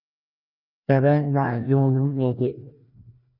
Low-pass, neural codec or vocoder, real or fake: 5.4 kHz; codec, 16 kHz, 1 kbps, FreqCodec, larger model; fake